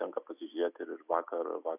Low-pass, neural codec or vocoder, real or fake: 3.6 kHz; none; real